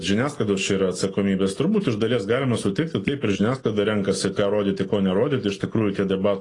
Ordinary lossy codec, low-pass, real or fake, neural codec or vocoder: AAC, 32 kbps; 10.8 kHz; real; none